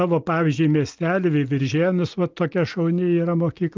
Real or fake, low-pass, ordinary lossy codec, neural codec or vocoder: real; 7.2 kHz; Opus, 32 kbps; none